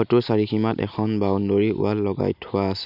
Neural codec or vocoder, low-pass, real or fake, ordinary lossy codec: none; 5.4 kHz; real; none